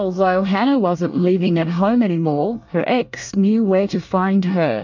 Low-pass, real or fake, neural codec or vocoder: 7.2 kHz; fake; codec, 24 kHz, 1 kbps, SNAC